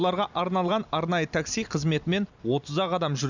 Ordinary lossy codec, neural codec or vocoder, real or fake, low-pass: none; none; real; 7.2 kHz